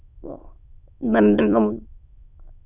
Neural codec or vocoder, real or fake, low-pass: autoencoder, 22.05 kHz, a latent of 192 numbers a frame, VITS, trained on many speakers; fake; 3.6 kHz